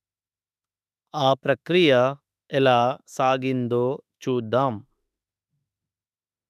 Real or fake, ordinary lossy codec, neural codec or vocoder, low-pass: fake; none; autoencoder, 48 kHz, 32 numbers a frame, DAC-VAE, trained on Japanese speech; 14.4 kHz